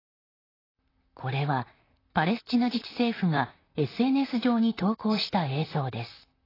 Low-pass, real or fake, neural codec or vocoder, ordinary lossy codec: 5.4 kHz; fake; vocoder, 44.1 kHz, 128 mel bands, Pupu-Vocoder; AAC, 24 kbps